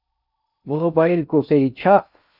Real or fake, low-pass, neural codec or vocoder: fake; 5.4 kHz; codec, 16 kHz in and 24 kHz out, 0.6 kbps, FocalCodec, streaming, 4096 codes